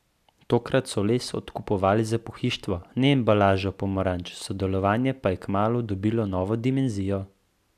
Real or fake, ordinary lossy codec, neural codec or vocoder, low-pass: real; none; none; 14.4 kHz